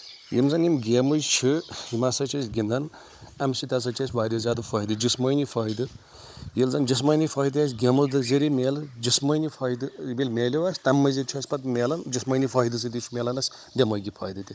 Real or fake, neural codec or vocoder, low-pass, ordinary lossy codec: fake; codec, 16 kHz, 16 kbps, FunCodec, trained on Chinese and English, 50 frames a second; none; none